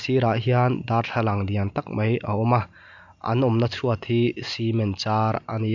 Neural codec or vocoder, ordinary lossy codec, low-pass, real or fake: none; none; 7.2 kHz; real